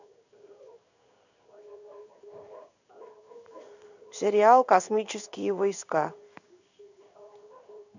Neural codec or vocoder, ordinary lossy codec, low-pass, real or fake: codec, 16 kHz in and 24 kHz out, 1 kbps, XY-Tokenizer; none; 7.2 kHz; fake